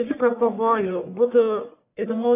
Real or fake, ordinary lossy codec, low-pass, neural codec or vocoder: fake; AAC, 24 kbps; 3.6 kHz; codec, 44.1 kHz, 1.7 kbps, Pupu-Codec